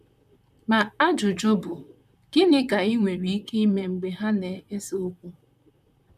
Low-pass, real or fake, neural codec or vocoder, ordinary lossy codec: 14.4 kHz; fake; vocoder, 44.1 kHz, 128 mel bands, Pupu-Vocoder; none